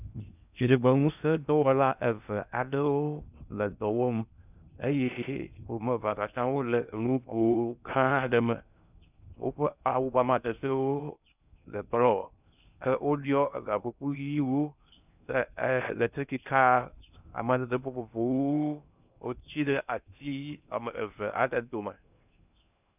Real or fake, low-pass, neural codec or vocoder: fake; 3.6 kHz; codec, 16 kHz in and 24 kHz out, 0.6 kbps, FocalCodec, streaming, 2048 codes